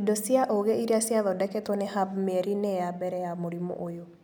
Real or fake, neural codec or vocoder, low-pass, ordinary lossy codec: real; none; none; none